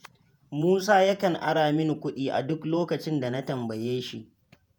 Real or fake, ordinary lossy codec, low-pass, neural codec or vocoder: fake; none; none; vocoder, 48 kHz, 128 mel bands, Vocos